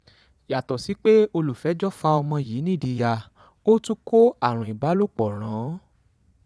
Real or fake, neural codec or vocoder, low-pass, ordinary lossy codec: fake; vocoder, 22.05 kHz, 80 mel bands, Vocos; none; none